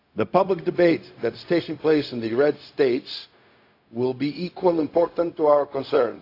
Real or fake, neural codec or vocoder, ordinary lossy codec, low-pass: fake; codec, 16 kHz, 0.4 kbps, LongCat-Audio-Codec; AAC, 32 kbps; 5.4 kHz